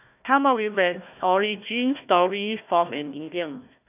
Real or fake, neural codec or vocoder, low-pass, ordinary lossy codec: fake; codec, 16 kHz, 1 kbps, FunCodec, trained on Chinese and English, 50 frames a second; 3.6 kHz; none